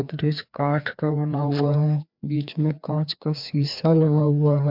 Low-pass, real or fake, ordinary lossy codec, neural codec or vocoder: 5.4 kHz; fake; MP3, 48 kbps; codec, 16 kHz, 2 kbps, FreqCodec, larger model